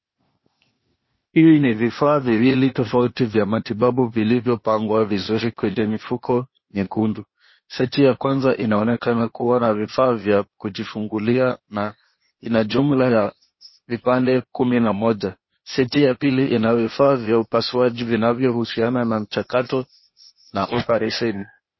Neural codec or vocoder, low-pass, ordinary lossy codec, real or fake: codec, 16 kHz, 0.8 kbps, ZipCodec; 7.2 kHz; MP3, 24 kbps; fake